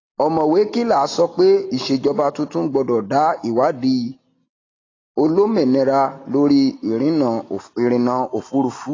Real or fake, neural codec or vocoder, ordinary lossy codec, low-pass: real; none; AAC, 32 kbps; 7.2 kHz